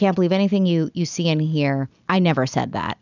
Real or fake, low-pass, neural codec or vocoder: real; 7.2 kHz; none